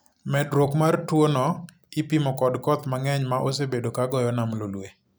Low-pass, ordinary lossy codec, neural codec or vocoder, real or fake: none; none; none; real